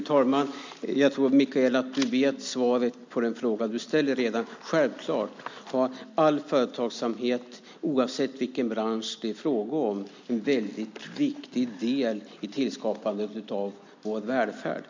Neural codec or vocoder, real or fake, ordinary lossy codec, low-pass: none; real; MP3, 64 kbps; 7.2 kHz